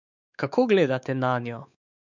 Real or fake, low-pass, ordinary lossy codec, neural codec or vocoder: fake; 7.2 kHz; none; codec, 16 kHz, 4 kbps, X-Codec, WavLM features, trained on Multilingual LibriSpeech